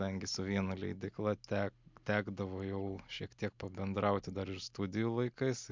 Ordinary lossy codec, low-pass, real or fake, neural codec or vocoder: MP3, 64 kbps; 7.2 kHz; real; none